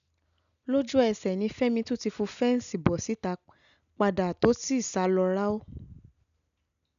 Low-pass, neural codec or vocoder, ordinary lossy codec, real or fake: 7.2 kHz; none; none; real